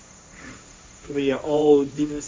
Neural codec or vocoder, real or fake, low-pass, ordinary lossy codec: codec, 16 kHz, 1.1 kbps, Voila-Tokenizer; fake; none; none